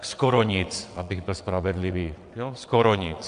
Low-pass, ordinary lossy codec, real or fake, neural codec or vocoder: 9.9 kHz; AAC, 96 kbps; fake; vocoder, 22.05 kHz, 80 mel bands, WaveNeXt